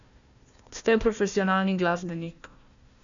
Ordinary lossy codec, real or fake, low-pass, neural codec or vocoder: none; fake; 7.2 kHz; codec, 16 kHz, 1 kbps, FunCodec, trained on Chinese and English, 50 frames a second